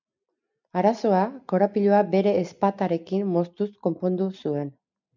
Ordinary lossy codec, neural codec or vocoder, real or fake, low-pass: MP3, 64 kbps; none; real; 7.2 kHz